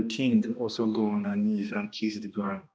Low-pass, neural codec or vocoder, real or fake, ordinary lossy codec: none; codec, 16 kHz, 1 kbps, X-Codec, HuBERT features, trained on balanced general audio; fake; none